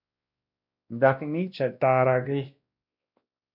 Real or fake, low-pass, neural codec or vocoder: fake; 5.4 kHz; codec, 16 kHz, 1 kbps, X-Codec, WavLM features, trained on Multilingual LibriSpeech